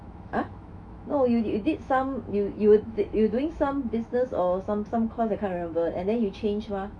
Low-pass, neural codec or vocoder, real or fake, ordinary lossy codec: 9.9 kHz; autoencoder, 48 kHz, 128 numbers a frame, DAC-VAE, trained on Japanese speech; fake; none